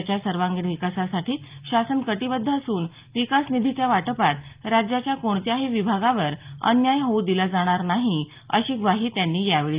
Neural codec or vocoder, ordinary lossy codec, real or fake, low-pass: none; Opus, 32 kbps; real; 3.6 kHz